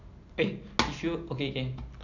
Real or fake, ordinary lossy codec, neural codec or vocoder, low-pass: real; none; none; 7.2 kHz